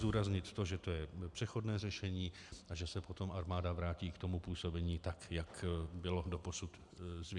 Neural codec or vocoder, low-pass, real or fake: none; 10.8 kHz; real